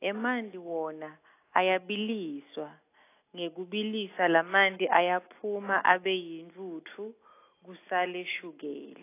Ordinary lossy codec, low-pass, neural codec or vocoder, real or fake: AAC, 24 kbps; 3.6 kHz; none; real